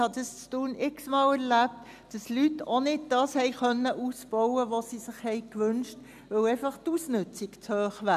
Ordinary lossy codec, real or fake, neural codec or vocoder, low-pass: none; real; none; 14.4 kHz